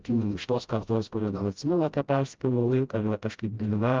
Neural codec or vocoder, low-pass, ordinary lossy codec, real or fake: codec, 16 kHz, 0.5 kbps, FreqCodec, smaller model; 7.2 kHz; Opus, 24 kbps; fake